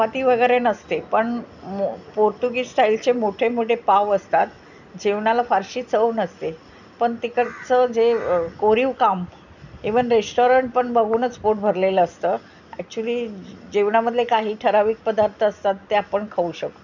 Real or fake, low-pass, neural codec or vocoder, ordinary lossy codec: real; 7.2 kHz; none; none